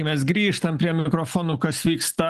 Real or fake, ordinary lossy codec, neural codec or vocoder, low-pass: real; Opus, 24 kbps; none; 14.4 kHz